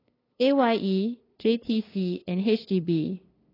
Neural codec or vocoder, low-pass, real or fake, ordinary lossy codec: codec, 16 kHz, 2 kbps, FunCodec, trained on LibriTTS, 25 frames a second; 5.4 kHz; fake; AAC, 24 kbps